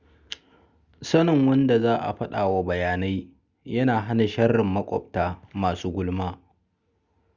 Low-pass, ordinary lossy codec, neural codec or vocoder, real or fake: 7.2 kHz; Opus, 64 kbps; none; real